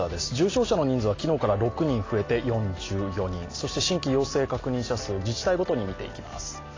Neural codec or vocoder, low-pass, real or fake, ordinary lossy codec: none; 7.2 kHz; real; AAC, 32 kbps